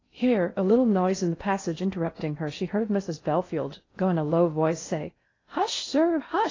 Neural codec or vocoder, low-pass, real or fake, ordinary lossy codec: codec, 16 kHz in and 24 kHz out, 0.6 kbps, FocalCodec, streaming, 2048 codes; 7.2 kHz; fake; AAC, 32 kbps